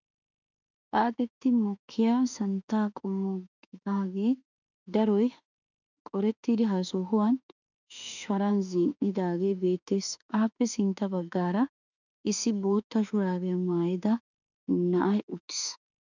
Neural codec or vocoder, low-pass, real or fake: autoencoder, 48 kHz, 32 numbers a frame, DAC-VAE, trained on Japanese speech; 7.2 kHz; fake